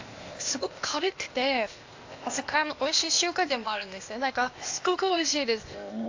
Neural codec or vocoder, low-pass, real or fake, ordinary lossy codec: codec, 16 kHz, 0.8 kbps, ZipCodec; 7.2 kHz; fake; AAC, 48 kbps